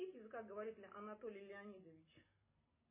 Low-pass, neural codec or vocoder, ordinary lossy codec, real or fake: 3.6 kHz; none; MP3, 16 kbps; real